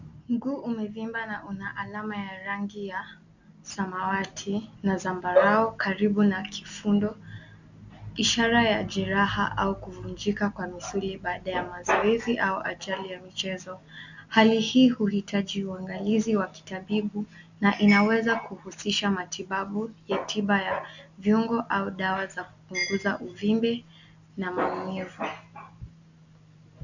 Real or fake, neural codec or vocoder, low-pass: real; none; 7.2 kHz